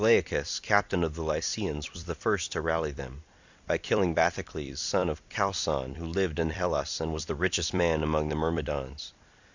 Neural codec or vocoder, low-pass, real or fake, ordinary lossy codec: vocoder, 44.1 kHz, 128 mel bands every 256 samples, BigVGAN v2; 7.2 kHz; fake; Opus, 64 kbps